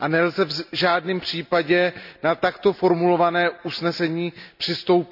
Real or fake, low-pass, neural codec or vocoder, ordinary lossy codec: real; 5.4 kHz; none; none